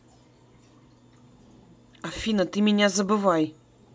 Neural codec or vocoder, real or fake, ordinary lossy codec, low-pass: none; real; none; none